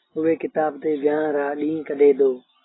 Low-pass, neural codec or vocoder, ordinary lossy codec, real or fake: 7.2 kHz; none; AAC, 16 kbps; real